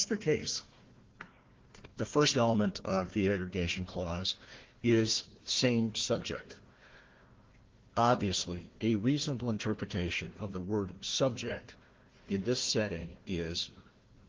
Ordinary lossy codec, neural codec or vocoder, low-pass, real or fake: Opus, 16 kbps; codec, 16 kHz, 1 kbps, FunCodec, trained on Chinese and English, 50 frames a second; 7.2 kHz; fake